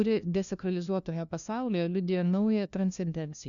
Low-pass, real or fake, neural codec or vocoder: 7.2 kHz; fake; codec, 16 kHz, 1 kbps, FunCodec, trained on LibriTTS, 50 frames a second